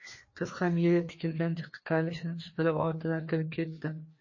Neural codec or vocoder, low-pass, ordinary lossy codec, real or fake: codec, 16 kHz, 2 kbps, FreqCodec, larger model; 7.2 kHz; MP3, 32 kbps; fake